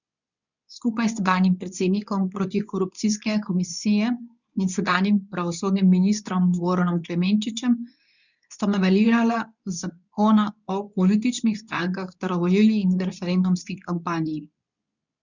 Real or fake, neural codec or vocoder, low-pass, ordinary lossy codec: fake; codec, 24 kHz, 0.9 kbps, WavTokenizer, medium speech release version 2; 7.2 kHz; none